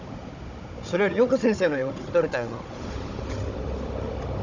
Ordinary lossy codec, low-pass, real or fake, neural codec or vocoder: none; 7.2 kHz; fake; codec, 16 kHz, 16 kbps, FunCodec, trained on Chinese and English, 50 frames a second